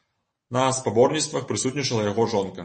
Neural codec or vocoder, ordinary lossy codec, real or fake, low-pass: none; MP3, 32 kbps; real; 10.8 kHz